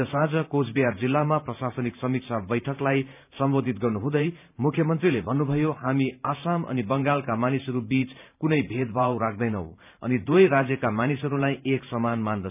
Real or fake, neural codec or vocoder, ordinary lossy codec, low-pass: fake; vocoder, 44.1 kHz, 128 mel bands every 512 samples, BigVGAN v2; none; 3.6 kHz